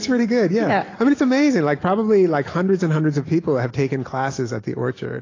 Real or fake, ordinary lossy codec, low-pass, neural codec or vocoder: real; AAC, 32 kbps; 7.2 kHz; none